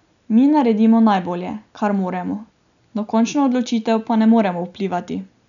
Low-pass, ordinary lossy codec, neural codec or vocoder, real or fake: 7.2 kHz; none; none; real